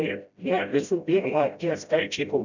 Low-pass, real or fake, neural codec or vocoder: 7.2 kHz; fake; codec, 16 kHz, 0.5 kbps, FreqCodec, smaller model